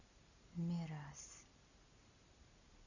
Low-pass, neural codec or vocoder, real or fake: 7.2 kHz; none; real